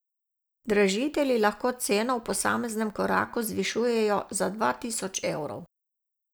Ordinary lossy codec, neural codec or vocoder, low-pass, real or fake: none; none; none; real